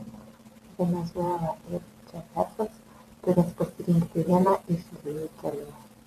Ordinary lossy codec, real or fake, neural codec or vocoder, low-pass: MP3, 96 kbps; fake; vocoder, 44.1 kHz, 128 mel bands every 512 samples, BigVGAN v2; 14.4 kHz